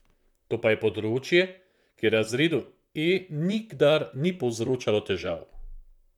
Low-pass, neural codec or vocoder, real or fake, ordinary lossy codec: 19.8 kHz; vocoder, 44.1 kHz, 128 mel bands, Pupu-Vocoder; fake; none